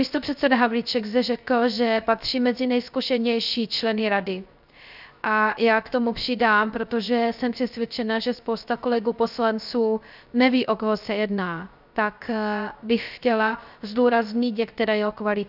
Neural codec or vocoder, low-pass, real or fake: codec, 16 kHz, 0.3 kbps, FocalCodec; 5.4 kHz; fake